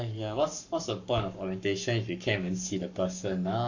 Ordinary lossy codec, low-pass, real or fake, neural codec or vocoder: none; 7.2 kHz; fake; codec, 44.1 kHz, 7.8 kbps, Pupu-Codec